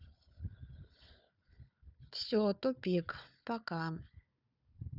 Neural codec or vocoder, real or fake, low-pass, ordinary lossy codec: codec, 16 kHz, 4 kbps, FunCodec, trained on Chinese and English, 50 frames a second; fake; 5.4 kHz; none